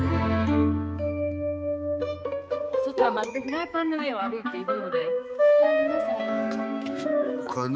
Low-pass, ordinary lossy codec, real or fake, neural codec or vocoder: none; none; fake; codec, 16 kHz, 4 kbps, X-Codec, HuBERT features, trained on balanced general audio